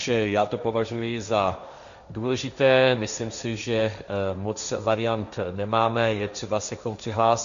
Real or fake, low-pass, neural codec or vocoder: fake; 7.2 kHz; codec, 16 kHz, 1.1 kbps, Voila-Tokenizer